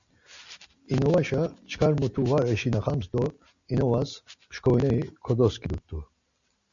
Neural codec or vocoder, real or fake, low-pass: none; real; 7.2 kHz